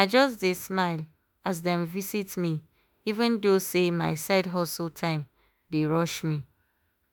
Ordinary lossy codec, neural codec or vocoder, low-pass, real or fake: none; autoencoder, 48 kHz, 32 numbers a frame, DAC-VAE, trained on Japanese speech; none; fake